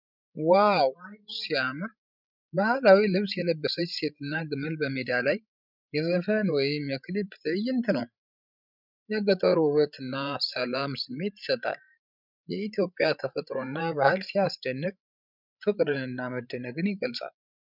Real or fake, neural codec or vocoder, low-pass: fake; codec, 16 kHz, 16 kbps, FreqCodec, larger model; 5.4 kHz